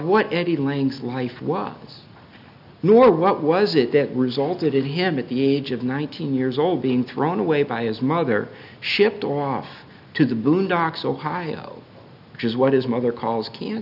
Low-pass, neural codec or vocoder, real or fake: 5.4 kHz; none; real